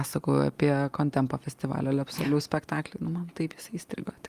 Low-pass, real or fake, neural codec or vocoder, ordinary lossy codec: 14.4 kHz; real; none; Opus, 32 kbps